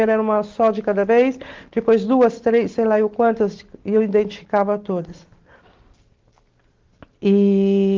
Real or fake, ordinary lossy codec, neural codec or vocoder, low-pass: real; Opus, 16 kbps; none; 7.2 kHz